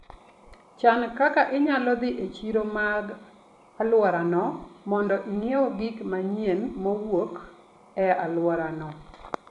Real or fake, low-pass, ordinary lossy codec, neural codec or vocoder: fake; 10.8 kHz; none; vocoder, 44.1 kHz, 128 mel bands every 256 samples, BigVGAN v2